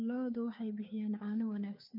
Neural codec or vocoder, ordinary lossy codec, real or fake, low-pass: codec, 16 kHz, 2 kbps, FunCodec, trained on Chinese and English, 25 frames a second; none; fake; 5.4 kHz